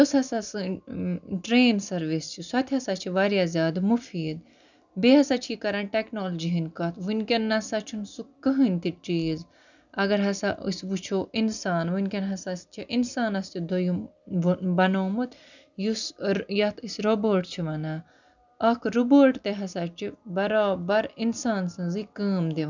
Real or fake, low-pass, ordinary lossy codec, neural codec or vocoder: real; 7.2 kHz; none; none